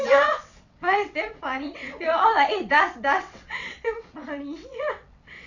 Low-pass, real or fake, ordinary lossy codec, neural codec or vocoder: 7.2 kHz; fake; none; vocoder, 22.05 kHz, 80 mel bands, WaveNeXt